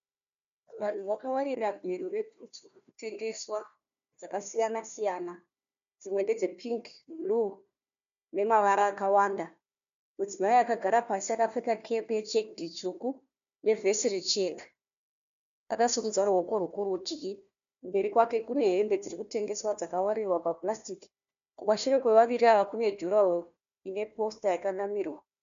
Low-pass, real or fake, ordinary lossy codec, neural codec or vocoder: 7.2 kHz; fake; MP3, 64 kbps; codec, 16 kHz, 1 kbps, FunCodec, trained on Chinese and English, 50 frames a second